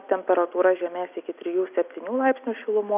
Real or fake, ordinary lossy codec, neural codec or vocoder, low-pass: real; AAC, 32 kbps; none; 3.6 kHz